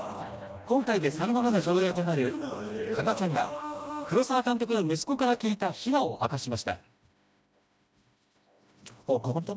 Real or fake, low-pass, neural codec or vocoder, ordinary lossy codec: fake; none; codec, 16 kHz, 1 kbps, FreqCodec, smaller model; none